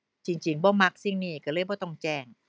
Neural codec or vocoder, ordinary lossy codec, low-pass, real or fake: none; none; none; real